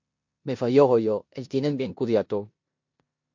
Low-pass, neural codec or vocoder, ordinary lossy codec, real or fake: 7.2 kHz; codec, 16 kHz in and 24 kHz out, 0.9 kbps, LongCat-Audio-Codec, four codebook decoder; MP3, 48 kbps; fake